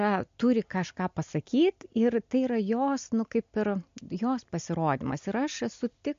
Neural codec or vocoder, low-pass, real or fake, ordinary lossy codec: none; 7.2 kHz; real; MP3, 48 kbps